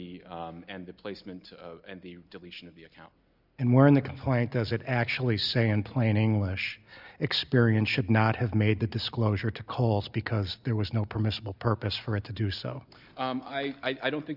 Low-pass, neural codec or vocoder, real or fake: 5.4 kHz; none; real